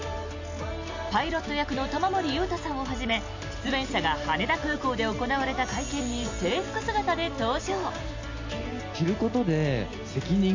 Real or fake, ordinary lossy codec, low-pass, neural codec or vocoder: real; AAC, 48 kbps; 7.2 kHz; none